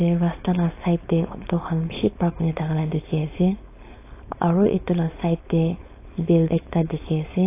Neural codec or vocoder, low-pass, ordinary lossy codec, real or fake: codec, 16 kHz, 4.8 kbps, FACodec; 3.6 kHz; AAC, 24 kbps; fake